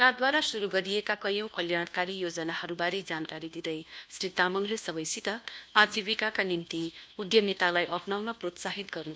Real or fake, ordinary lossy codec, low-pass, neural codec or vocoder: fake; none; none; codec, 16 kHz, 1 kbps, FunCodec, trained on LibriTTS, 50 frames a second